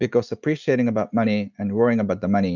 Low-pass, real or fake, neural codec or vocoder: 7.2 kHz; real; none